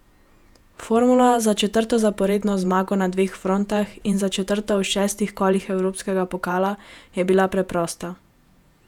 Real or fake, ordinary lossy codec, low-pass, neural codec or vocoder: fake; none; 19.8 kHz; vocoder, 48 kHz, 128 mel bands, Vocos